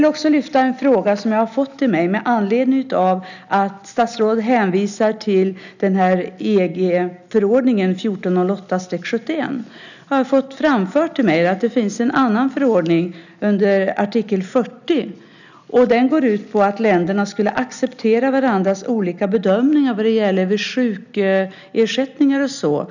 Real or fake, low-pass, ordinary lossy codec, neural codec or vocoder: real; 7.2 kHz; none; none